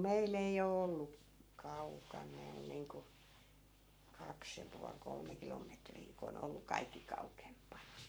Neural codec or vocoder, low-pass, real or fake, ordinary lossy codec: codec, 44.1 kHz, 7.8 kbps, Pupu-Codec; none; fake; none